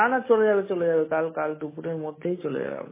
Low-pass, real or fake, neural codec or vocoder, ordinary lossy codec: 3.6 kHz; real; none; MP3, 16 kbps